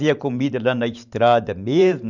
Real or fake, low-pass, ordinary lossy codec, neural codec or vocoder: fake; 7.2 kHz; none; vocoder, 44.1 kHz, 128 mel bands every 512 samples, BigVGAN v2